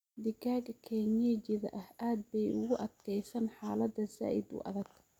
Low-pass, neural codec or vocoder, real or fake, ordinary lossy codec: 19.8 kHz; none; real; none